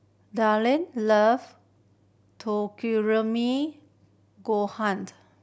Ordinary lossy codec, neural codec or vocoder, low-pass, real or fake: none; none; none; real